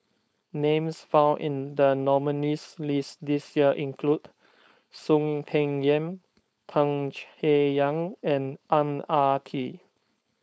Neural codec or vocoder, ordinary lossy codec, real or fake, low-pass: codec, 16 kHz, 4.8 kbps, FACodec; none; fake; none